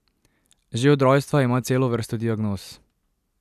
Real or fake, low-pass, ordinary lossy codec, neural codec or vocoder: real; 14.4 kHz; none; none